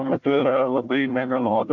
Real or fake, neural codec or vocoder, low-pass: fake; codec, 16 kHz, 1 kbps, FunCodec, trained on Chinese and English, 50 frames a second; 7.2 kHz